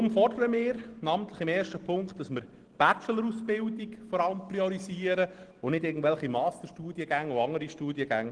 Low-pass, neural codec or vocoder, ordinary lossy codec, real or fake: 9.9 kHz; none; Opus, 16 kbps; real